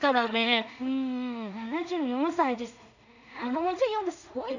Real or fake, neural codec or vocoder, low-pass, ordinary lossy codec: fake; codec, 16 kHz in and 24 kHz out, 0.4 kbps, LongCat-Audio-Codec, two codebook decoder; 7.2 kHz; none